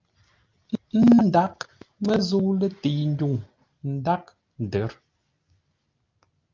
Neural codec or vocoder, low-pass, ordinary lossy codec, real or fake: none; 7.2 kHz; Opus, 24 kbps; real